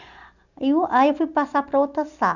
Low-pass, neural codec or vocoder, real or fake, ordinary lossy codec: 7.2 kHz; none; real; none